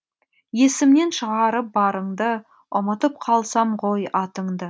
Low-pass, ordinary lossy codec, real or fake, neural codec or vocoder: none; none; real; none